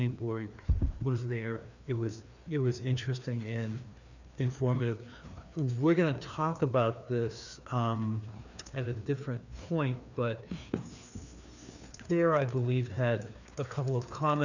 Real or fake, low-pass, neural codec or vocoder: fake; 7.2 kHz; codec, 16 kHz, 2 kbps, FreqCodec, larger model